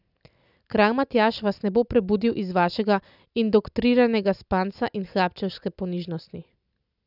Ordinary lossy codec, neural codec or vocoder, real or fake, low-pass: none; none; real; 5.4 kHz